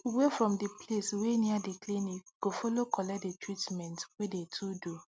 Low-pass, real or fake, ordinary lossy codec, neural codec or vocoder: none; real; none; none